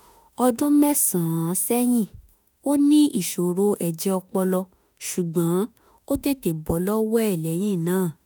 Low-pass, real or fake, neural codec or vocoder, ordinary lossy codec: none; fake; autoencoder, 48 kHz, 32 numbers a frame, DAC-VAE, trained on Japanese speech; none